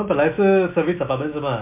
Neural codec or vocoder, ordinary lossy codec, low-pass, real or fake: none; MP3, 24 kbps; 3.6 kHz; real